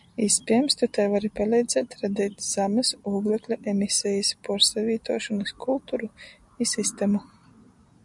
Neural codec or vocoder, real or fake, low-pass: none; real; 10.8 kHz